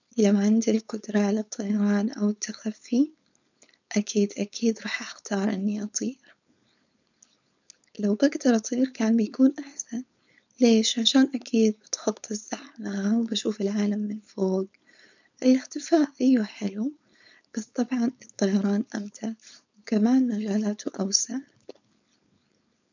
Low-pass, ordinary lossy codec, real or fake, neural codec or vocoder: 7.2 kHz; none; fake; codec, 16 kHz, 4.8 kbps, FACodec